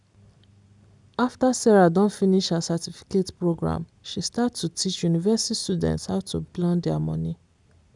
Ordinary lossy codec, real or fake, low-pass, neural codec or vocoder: none; real; 10.8 kHz; none